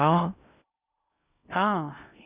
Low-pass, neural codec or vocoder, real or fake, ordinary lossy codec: 3.6 kHz; codec, 16 kHz in and 24 kHz out, 0.6 kbps, FocalCodec, streaming, 2048 codes; fake; Opus, 64 kbps